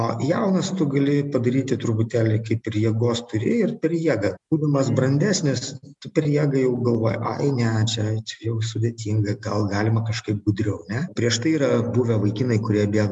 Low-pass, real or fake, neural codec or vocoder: 10.8 kHz; real; none